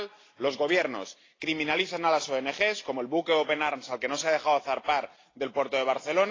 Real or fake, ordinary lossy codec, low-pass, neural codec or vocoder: real; AAC, 32 kbps; 7.2 kHz; none